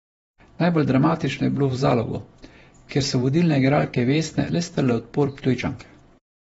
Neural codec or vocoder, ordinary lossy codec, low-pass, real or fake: vocoder, 48 kHz, 128 mel bands, Vocos; AAC, 24 kbps; 19.8 kHz; fake